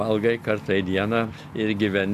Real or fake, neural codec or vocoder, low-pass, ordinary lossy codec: real; none; 14.4 kHz; AAC, 96 kbps